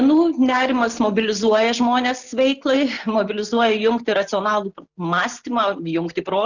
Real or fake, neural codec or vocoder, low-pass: real; none; 7.2 kHz